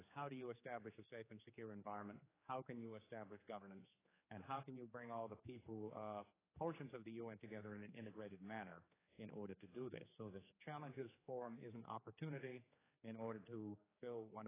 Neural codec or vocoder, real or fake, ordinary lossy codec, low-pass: codec, 16 kHz, 4 kbps, X-Codec, HuBERT features, trained on general audio; fake; AAC, 16 kbps; 3.6 kHz